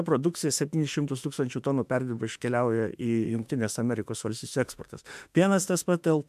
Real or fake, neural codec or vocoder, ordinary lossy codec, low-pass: fake; autoencoder, 48 kHz, 32 numbers a frame, DAC-VAE, trained on Japanese speech; MP3, 96 kbps; 14.4 kHz